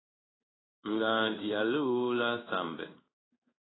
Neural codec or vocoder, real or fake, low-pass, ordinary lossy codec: codec, 16 kHz in and 24 kHz out, 1 kbps, XY-Tokenizer; fake; 7.2 kHz; AAC, 16 kbps